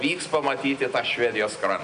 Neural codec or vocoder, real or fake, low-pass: none; real; 9.9 kHz